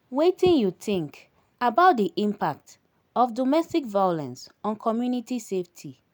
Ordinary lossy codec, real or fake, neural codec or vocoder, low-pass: none; real; none; none